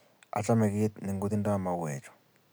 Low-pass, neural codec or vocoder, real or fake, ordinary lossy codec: none; none; real; none